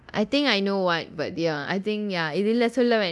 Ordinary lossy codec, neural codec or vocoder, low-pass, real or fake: none; codec, 24 kHz, 0.9 kbps, DualCodec; none; fake